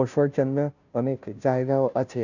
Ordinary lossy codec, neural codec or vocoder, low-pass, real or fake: none; codec, 16 kHz, 0.5 kbps, FunCodec, trained on Chinese and English, 25 frames a second; 7.2 kHz; fake